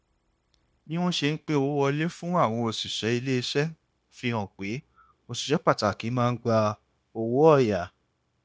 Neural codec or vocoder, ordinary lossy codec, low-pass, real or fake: codec, 16 kHz, 0.9 kbps, LongCat-Audio-Codec; none; none; fake